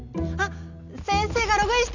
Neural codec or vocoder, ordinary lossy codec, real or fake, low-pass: none; none; real; 7.2 kHz